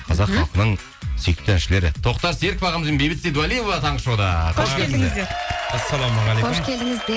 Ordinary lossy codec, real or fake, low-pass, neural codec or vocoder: none; real; none; none